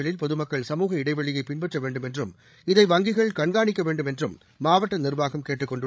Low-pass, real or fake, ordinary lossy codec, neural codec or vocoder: none; fake; none; codec, 16 kHz, 16 kbps, FreqCodec, larger model